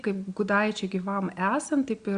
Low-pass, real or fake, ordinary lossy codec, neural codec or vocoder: 9.9 kHz; real; Opus, 64 kbps; none